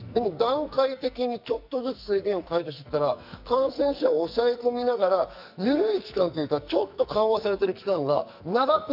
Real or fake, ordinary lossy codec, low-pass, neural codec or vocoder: fake; none; 5.4 kHz; codec, 44.1 kHz, 2.6 kbps, SNAC